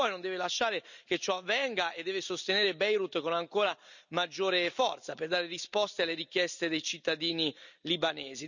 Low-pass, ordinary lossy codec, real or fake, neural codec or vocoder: 7.2 kHz; none; real; none